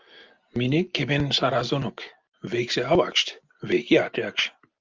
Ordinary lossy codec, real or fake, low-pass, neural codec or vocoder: Opus, 32 kbps; real; 7.2 kHz; none